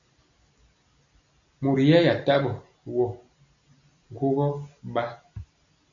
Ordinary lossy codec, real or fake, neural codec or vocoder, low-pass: MP3, 64 kbps; real; none; 7.2 kHz